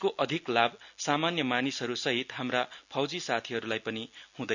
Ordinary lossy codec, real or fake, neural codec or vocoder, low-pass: none; real; none; 7.2 kHz